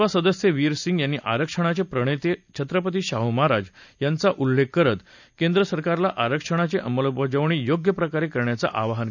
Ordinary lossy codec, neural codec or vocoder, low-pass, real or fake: none; none; 7.2 kHz; real